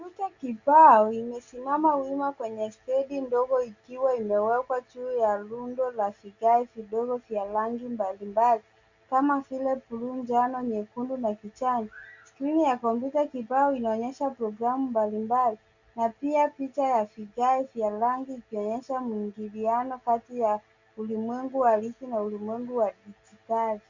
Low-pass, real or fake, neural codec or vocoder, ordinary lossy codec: 7.2 kHz; real; none; Opus, 64 kbps